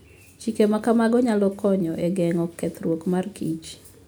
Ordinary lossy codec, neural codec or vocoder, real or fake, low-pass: none; none; real; none